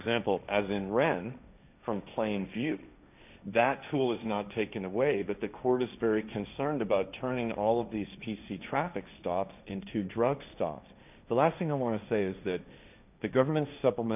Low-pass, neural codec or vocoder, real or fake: 3.6 kHz; codec, 16 kHz, 1.1 kbps, Voila-Tokenizer; fake